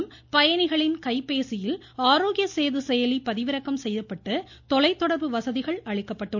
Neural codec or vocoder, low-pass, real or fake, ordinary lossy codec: none; none; real; none